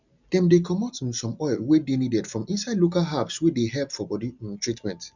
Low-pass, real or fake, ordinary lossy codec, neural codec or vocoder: 7.2 kHz; real; none; none